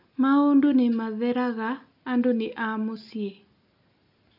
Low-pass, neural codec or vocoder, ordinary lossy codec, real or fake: 5.4 kHz; none; AAC, 48 kbps; real